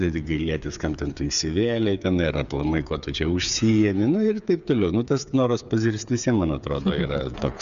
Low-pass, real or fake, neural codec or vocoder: 7.2 kHz; fake; codec, 16 kHz, 8 kbps, FreqCodec, smaller model